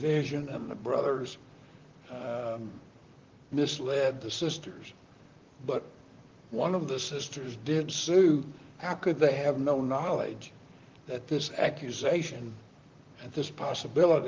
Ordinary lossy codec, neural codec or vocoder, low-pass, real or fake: Opus, 32 kbps; vocoder, 44.1 kHz, 128 mel bands, Pupu-Vocoder; 7.2 kHz; fake